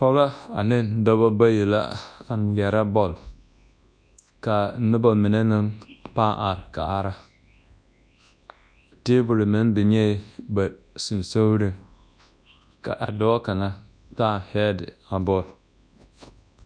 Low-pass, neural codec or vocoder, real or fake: 9.9 kHz; codec, 24 kHz, 0.9 kbps, WavTokenizer, large speech release; fake